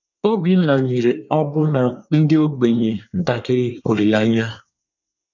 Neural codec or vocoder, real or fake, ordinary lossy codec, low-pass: codec, 24 kHz, 1 kbps, SNAC; fake; none; 7.2 kHz